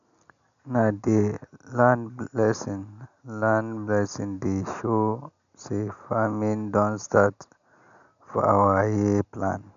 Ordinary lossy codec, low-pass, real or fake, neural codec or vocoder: none; 7.2 kHz; real; none